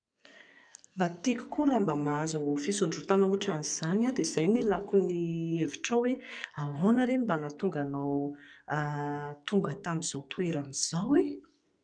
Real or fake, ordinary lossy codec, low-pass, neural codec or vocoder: fake; none; 9.9 kHz; codec, 44.1 kHz, 2.6 kbps, SNAC